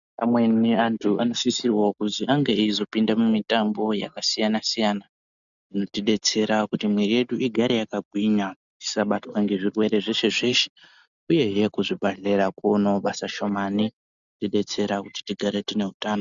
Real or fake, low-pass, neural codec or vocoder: real; 7.2 kHz; none